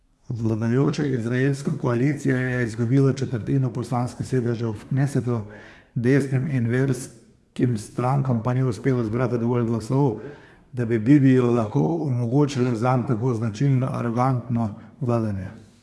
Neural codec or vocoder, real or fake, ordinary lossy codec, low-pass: codec, 24 kHz, 1 kbps, SNAC; fake; none; none